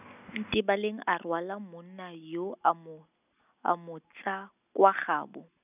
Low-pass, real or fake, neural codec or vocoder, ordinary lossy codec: 3.6 kHz; real; none; none